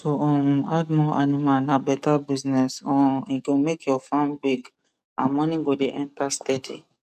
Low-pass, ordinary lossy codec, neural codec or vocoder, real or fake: 14.4 kHz; none; codec, 44.1 kHz, 7.8 kbps, DAC; fake